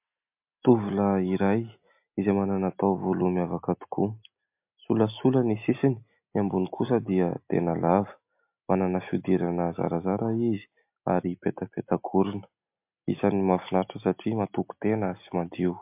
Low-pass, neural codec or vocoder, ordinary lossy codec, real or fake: 3.6 kHz; none; MP3, 32 kbps; real